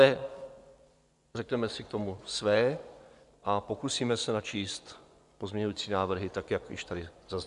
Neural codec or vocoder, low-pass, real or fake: vocoder, 24 kHz, 100 mel bands, Vocos; 10.8 kHz; fake